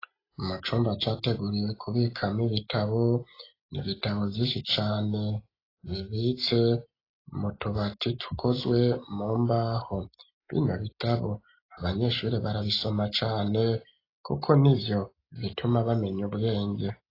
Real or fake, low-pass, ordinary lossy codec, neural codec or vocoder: real; 5.4 kHz; AAC, 24 kbps; none